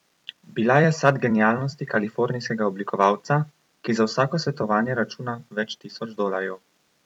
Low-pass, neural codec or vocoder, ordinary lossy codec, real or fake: 19.8 kHz; vocoder, 44.1 kHz, 128 mel bands every 256 samples, BigVGAN v2; none; fake